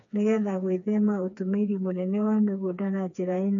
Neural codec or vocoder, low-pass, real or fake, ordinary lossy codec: codec, 16 kHz, 4 kbps, FreqCodec, smaller model; 7.2 kHz; fake; none